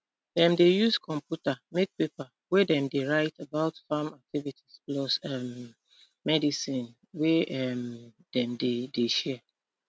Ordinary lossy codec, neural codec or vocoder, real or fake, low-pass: none; none; real; none